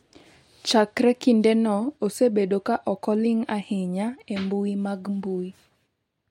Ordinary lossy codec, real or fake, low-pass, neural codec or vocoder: MP3, 64 kbps; real; 19.8 kHz; none